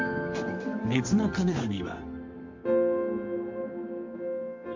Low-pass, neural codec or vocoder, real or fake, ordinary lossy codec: 7.2 kHz; codec, 24 kHz, 0.9 kbps, WavTokenizer, medium music audio release; fake; MP3, 64 kbps